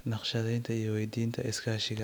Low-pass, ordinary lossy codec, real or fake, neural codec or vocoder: none; none; real; none